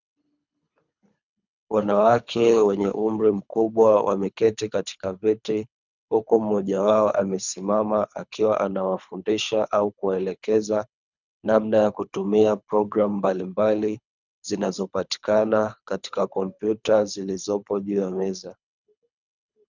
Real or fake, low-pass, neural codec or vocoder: fake; 7.2 kHz; codec, 24 kHz, 3 kbps, HILCodec